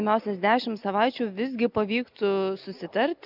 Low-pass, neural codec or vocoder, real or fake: 5.4 kHz; none; real